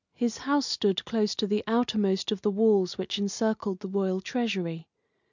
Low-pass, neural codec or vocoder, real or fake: 7.2 kHz; none; real